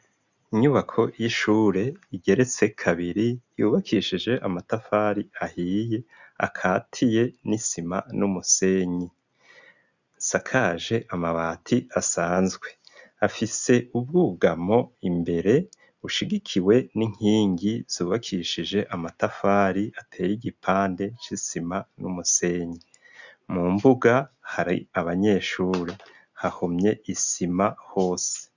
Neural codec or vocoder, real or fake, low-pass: none; real; 7.2 kHz